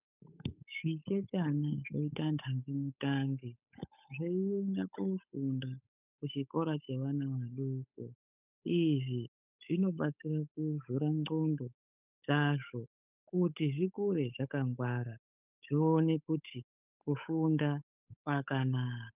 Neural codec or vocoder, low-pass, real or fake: codec, 16 kHz, 8 kbps, FunCodec, trained on Chinese and English, 25 frames a second; 3.6 kHz; fake